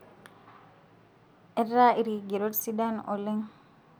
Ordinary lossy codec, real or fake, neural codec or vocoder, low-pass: none; real; none; none